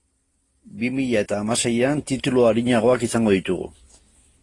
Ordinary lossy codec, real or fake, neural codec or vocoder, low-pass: AAC, 32 kbps; real; none; 10.8 kHz